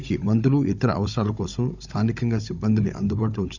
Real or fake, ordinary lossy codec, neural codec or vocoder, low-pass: fake; none; codec, 16 kHz, 16 kbps, FunCodec, trained on Chinese and English, 50 frames a second; 7.2 kHz